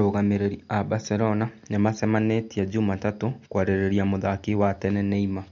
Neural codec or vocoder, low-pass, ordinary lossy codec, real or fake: none; 7.2 kHz; MP3, 48 kbps; real